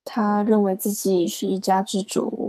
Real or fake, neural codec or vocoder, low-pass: fake; codec, 44.1 kHz, 2.6 kbps, SNAC; 14.4 kHz